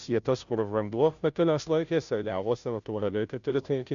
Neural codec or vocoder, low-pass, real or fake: codec, 16 kHz, 0.5 kbps, FunCodec, trained on Chinese and English, 25 frames a second; 7.2 kHz; fake